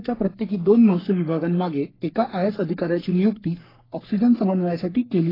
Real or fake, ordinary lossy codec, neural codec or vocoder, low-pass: fake; AAC, 24 kbps; codec, 44.1 kHz, 3.4 kbps, Pupu-Codec; 5.4 kHz